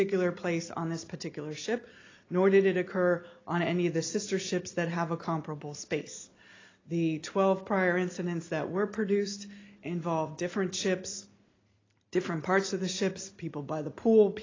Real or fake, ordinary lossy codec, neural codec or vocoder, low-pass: real; AAC, 32 kbps; none; 7.2 kHz